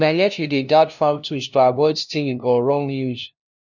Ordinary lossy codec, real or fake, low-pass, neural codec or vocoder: none; fake; 7.2 kHz; codec, 16 kHz, 0.5 kbps, FunCodec, trained on LibriTTS, 25 frames a second